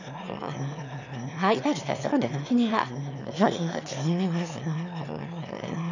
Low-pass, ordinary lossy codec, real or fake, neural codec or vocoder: 7.2 kHz; none; fake; autoencoder, 22.05 kHz, a latent of 192 numbers a frame, VITS, trained on one speaker